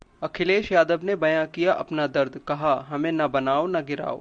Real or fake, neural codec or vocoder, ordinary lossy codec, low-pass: real; none; Opus, 64 kbps; 9.9 kHz